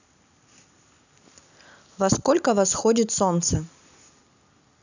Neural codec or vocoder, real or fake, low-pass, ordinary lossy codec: none; real; 7.2 kHz; none